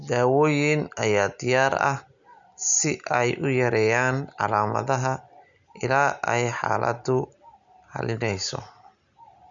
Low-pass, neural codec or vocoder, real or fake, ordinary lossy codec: 7.2 kHz; none; real; none